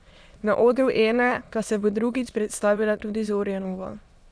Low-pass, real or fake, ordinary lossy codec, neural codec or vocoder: none; fake; none; autoencoder, 22.05 kHz, a latent of 192 numbers a frame, VITS, trained on many speakers